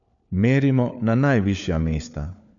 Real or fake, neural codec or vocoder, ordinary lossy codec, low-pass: fake; codec, 16 kHz, 4 kbps, FunCodec, trained on LibriTTS, 50 frames a second; none; 7.2 kHz